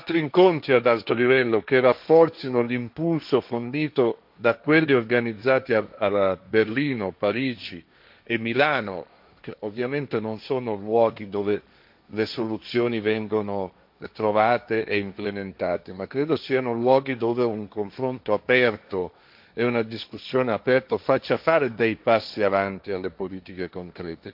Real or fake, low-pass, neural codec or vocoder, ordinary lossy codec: fake; 5.4 kHz; codec, 16 kHz, 1.1 kbps, Voila-Tokenizer; MP3, 48 kbps